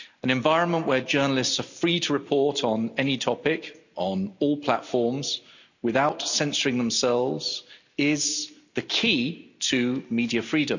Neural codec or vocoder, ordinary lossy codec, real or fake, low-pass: none; none; real; 7.2 kHz